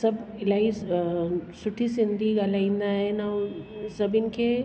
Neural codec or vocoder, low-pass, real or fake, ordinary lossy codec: none; none; real; none